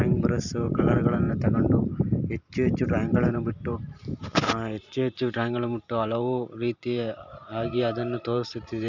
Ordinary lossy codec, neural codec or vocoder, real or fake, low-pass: none; vocoder, 44.1 kHz, 128 mel bands every 512 samples, BigVGAN v2; fake; 7.2 kHz